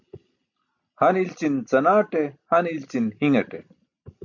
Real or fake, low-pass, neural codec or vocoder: real; 7.2 kHz; none